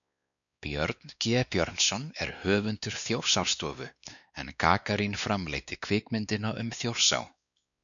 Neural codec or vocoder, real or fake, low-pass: codec, 16 kHz, 2 kbps, X-Codec, WavLM features, trained on Multilingual LibriSpeech; fake; 7.2 kHz